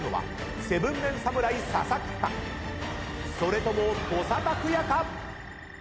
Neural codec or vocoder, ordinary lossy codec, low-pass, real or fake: none; none; none; real